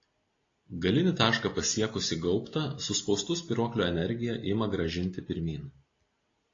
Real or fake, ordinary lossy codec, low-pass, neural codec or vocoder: real; AAC, 32 kbps; 7.2 kHz; none